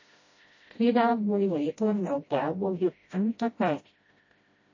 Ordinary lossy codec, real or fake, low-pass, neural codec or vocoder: MP3, 32 kbps; fake; 7.2 kHz; codec, 16 kHz, 0.5 kbps, FreqCodec, smaller model